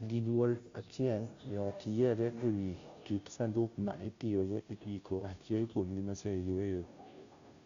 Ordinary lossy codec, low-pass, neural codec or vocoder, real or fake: Opus, 64 kbps; 7.2 kHz; codec, 16 kHz, 0.5 kbps, FunCodec, trained on Chinese and English, 25 frames a second; fake